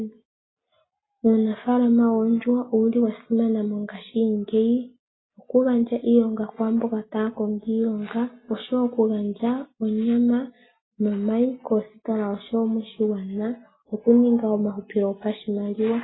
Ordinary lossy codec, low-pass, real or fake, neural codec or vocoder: AAC, 16 kbps; 7.2 kHz; real; none